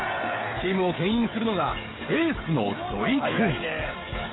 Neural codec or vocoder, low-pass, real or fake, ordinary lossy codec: codec, 16 kHz, 16 kbps, FreqCodec, smaller model; 7.2 kHz; fake; AAC, 16 kbps